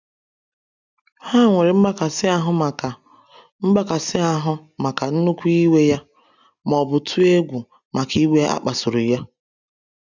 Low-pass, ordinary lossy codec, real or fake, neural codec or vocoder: 7.2 kHz; none; real; none